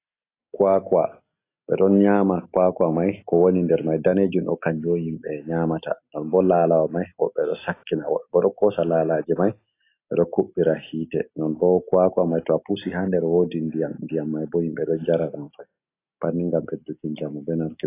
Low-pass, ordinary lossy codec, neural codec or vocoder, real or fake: 3.6 kHz; AAC, 24 kbps; none; real